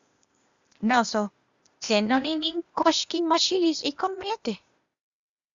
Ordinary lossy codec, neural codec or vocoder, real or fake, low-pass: Opus, 64 kbps; codec, 16 kHz, 0.8 kbps, ZipCodec; fake; 7.2 kHz